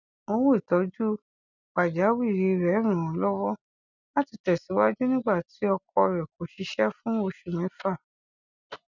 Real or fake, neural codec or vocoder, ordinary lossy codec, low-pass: real; none; none; 7.2 kHz